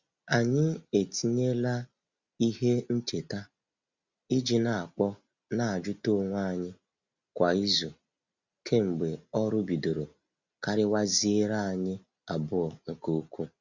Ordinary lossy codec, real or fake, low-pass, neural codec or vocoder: Opus, 64 kbps; real; 7.2 kHz; none